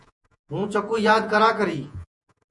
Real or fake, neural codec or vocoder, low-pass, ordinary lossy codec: fake; vocoder, 48 kHz, 128 mel bands, Vocos; 10.8 kHz; MP3, 64 kbps